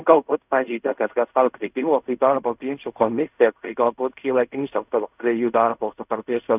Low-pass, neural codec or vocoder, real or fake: 3.6 kHz; codec, 16 kHz in and 24 kHz out, 0.4 kbps, LongCat-Audio-Codec, fine tuned four codebook decoder; fake